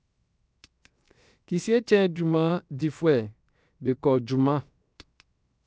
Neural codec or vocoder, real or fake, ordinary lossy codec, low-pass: codec, 16 kHz, 0.7 kbps, FocalCodec; fake; none; none